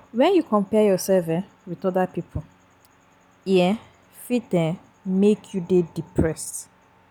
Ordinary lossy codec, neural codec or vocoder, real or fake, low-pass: none; none; real; 19.8 kHz